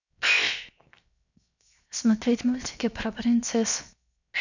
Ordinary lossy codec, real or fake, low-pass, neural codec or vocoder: none; fake; 7.2 kHz; codec, 16 kHz, 0.7 kbps, FocalCodec